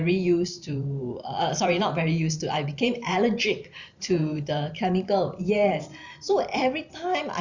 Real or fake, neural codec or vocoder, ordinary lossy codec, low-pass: fake; vocoder, 44.1 kHz, 128 mel bands every 512 samples, BigVGAN v2; none; 7.2 kHz